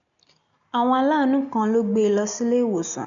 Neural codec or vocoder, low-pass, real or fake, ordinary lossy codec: none; 7.2 kHz; real; MP3, 96 kbps